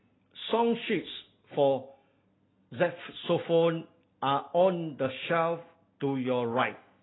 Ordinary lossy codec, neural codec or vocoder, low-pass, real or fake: AAC, 16 kbps; none; 7.2 kHz; real